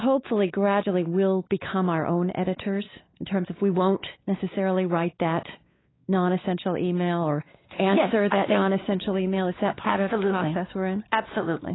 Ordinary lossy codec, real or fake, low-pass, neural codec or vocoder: AAC, 16 kbps; fake; 7.2 kHz; codec, 16 kHz, 4 kbps, X-Codec, WavLM features, trained on Multilingual LibriSpeech